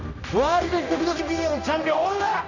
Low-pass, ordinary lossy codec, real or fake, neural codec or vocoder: 7.2 kHz; none; fake; codec, 16 kHz, 1 kbps, X-Codec, HuBERT features, trained on general audio